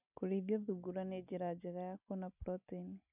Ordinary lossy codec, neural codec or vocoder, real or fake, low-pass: none; none; real; 3.6 kHz